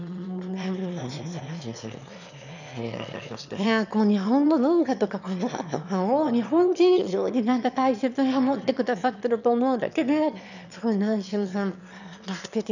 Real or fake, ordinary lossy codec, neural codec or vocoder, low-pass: fake; none; autoencoder, 22.05 kHz, a latent of 192 numbers a frame, VITS, trained on one speaker; 7.2 kHz